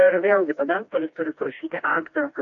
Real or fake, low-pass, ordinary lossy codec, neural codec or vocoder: fake; 7.2 kHz; AAC, 48 kbps; codec, 16 kHz, 1 kbps, FreqCodec, smaller model